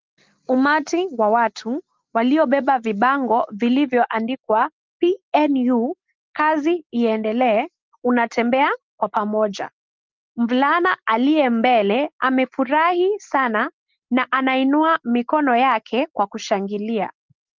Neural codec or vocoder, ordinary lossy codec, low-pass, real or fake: none; Opus, 32 kbps; 7.2 kHz; real